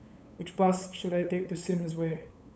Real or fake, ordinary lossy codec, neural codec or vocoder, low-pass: fake; none; codec, 16 kHz, 8 kbps, FunCodec, trained on LibriTTS, 25 frames a second; none